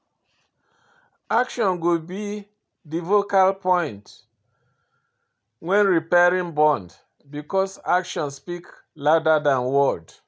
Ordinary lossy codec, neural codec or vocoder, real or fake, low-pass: none; none; real; none